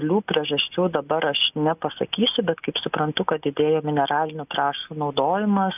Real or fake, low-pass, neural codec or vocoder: real; 3.6 kHz; none